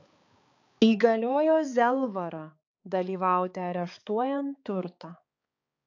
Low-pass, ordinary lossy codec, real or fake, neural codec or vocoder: 7.2 kHz; AAC, 48 kbps; fake; codec, 16 kHz, 4 kbps, X-Codec, HuBERT features, trained on balanced general audio